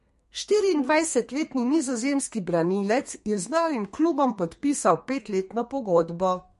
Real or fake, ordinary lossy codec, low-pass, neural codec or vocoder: fake; MP3, 48 kbps; 14.4 kHz; codec, 32 kHz, 1.9 kbps, SNAC